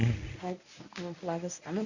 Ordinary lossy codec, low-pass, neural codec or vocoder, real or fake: none; 7.2 kHz; codec, 24 kHz, 0.9 kbps, WavTokenizer, medium speech release version 1; fake